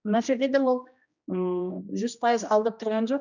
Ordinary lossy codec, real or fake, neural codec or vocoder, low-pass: none; fake; codec, 16 kHz, 1 kbps, X-Codec, HuBERT features, trained on general audio; 7.2 kHz